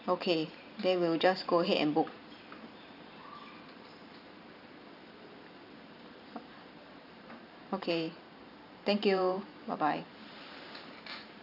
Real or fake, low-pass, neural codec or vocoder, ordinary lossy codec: fake; 5.4 kHz; vocoder, 44.1 kHz, 128 mel bands every 512 samples, BigVGAN v2; none